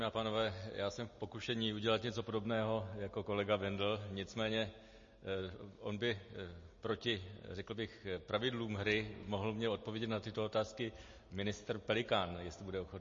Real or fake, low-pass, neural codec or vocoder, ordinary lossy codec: real; 7.2 kHz; none; MP3, 32 kbps